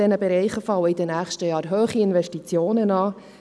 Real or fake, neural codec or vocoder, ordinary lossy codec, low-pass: real; none; none; none